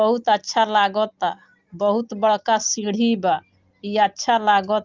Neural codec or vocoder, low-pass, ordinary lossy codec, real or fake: none; 7.2 kHz; Opus, 24 kbps; real